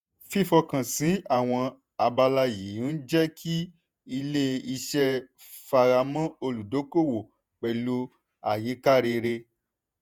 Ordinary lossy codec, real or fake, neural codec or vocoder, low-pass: none; fake; vocoder, 48 kHz, 128 mel bands, Vocos; none